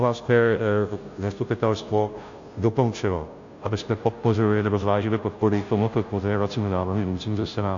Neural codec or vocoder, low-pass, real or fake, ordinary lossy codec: codec, 16 kHz, 0.5 kbps, FunCodec, trained on Chinese and English, 25 frames a second; 7.2 kHz; fake; AAC, 48 kbps